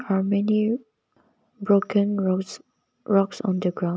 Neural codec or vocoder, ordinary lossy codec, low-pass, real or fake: none; none; none; real